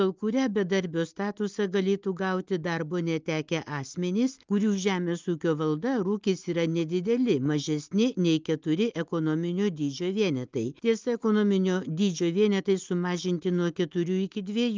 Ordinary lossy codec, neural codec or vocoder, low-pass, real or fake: Opus, 24 kbps; none; 7.2 kHz; real